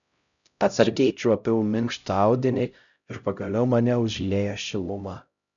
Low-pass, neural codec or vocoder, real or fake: 7.2 kHz; codec, 16 kHz, 0.5 kbps, X-Codec, HuBERT features, trained on LibriSpeech; fake